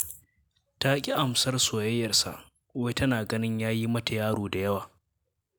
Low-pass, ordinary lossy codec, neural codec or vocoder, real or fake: none; none; none; real